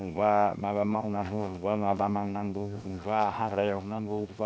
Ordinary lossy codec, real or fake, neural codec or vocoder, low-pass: none; fake; codec, 16 kHz, 0.7 kbps, FocalCodec; none